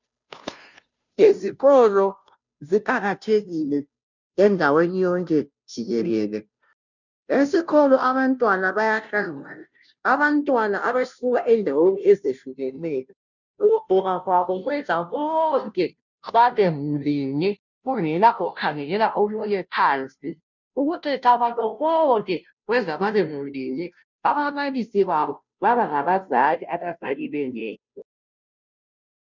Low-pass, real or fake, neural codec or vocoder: 7.2 kHz; fake; codec, 16 kHz, 0.5 kbps, FunCodec, trained on Chinese and English, 25 frames a second